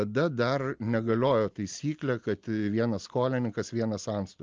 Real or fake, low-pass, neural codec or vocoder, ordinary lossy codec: real; 7.2 kHz; none; Opus, 24 kbps